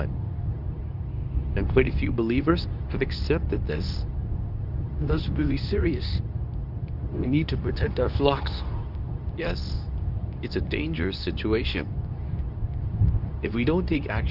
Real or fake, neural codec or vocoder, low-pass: fake; codec, 24 kHz, 0.9 kbps, WavTokenizer, medium speech release version 2; 5.4 kHz